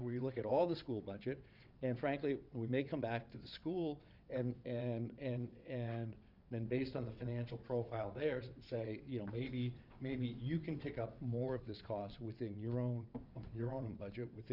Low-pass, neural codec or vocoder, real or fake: 5.4 kHz; vocoder, 22.05 kHz, 80 mel bands, WaveNeXt; fake